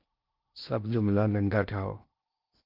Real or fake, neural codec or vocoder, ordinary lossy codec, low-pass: fake; codec, 16 kHz in and 24 kHz out, 0.6 kbps, FocalCodec, streaming, 2048 codes; Opus, 24 kbps; 5.4 kHz